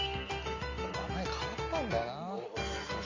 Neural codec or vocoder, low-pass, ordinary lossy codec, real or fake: none; 7.2 kHz; MP3, 48 kbps; real